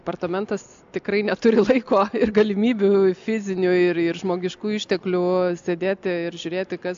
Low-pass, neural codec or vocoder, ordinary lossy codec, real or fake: 7.2 kHz; none; AAC, 64 kbps; real